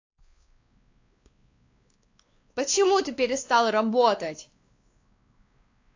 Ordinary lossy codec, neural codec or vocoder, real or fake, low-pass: AAC, 48 kbps; codec, 16 kHz, 2 kbps, X-Codec, WavLM features, trained on Multilingual LibriSpeech; fake; 7.2 kHz